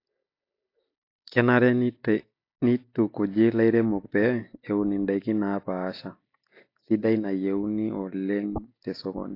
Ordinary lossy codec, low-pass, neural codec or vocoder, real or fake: AAC, 32 kbps; 5.4 kHz; none; real